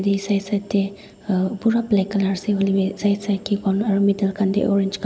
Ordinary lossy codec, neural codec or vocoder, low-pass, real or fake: none; none; none; real